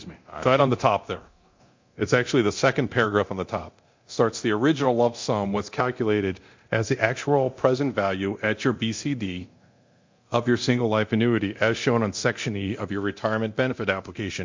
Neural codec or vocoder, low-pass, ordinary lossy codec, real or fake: codec, 24 kHz, 0.9 kbps, DualCodec; 7.2 kHz; MP3, 48 kbps; fake